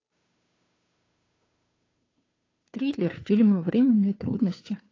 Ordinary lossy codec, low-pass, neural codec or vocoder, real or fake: AAC, 32 kbps; 7.2 kHz; codec, 16 kHz, 2 kbps, FunCodec, trained on Chinese and English, 25 frames a second; fake